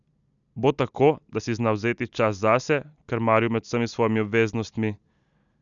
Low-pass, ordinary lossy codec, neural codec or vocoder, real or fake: 7.2 kHz; none; none; real